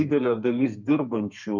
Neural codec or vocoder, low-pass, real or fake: codec, 32 kHz, 1.9 kbps, SNAC; 7.2 kHz; fake